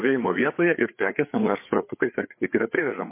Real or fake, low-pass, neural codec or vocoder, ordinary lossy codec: fake; 3.6 kHz; codec, 16 kHz, 4 kbps, FreqCodec, larger model; MP3, 24 kbps